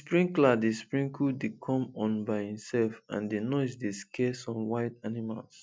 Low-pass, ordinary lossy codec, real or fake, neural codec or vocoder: none; none; real; none